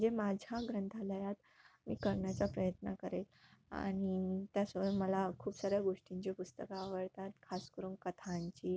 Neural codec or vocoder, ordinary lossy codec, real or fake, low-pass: none; none; real; none